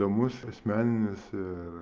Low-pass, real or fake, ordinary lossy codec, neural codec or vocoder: 7.2 kHz; real; Opus, 24 kbps; none